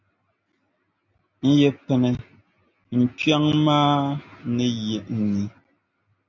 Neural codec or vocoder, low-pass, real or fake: none; 7.2 kHz; real